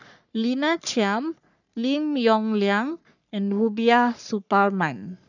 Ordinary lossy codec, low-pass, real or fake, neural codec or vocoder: none; 7.2 kHz; fake; codec, 44.1 kHz, 3.4 kbps, Pupu-Codec